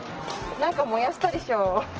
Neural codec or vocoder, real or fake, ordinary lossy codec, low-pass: none; real; Opus, 16 kbps; 7.2 kHz